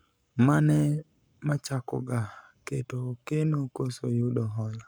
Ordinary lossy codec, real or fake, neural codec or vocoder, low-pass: none; fake; codec, 44.1 kHz, 7.8 kbps, Pupu-Codec; none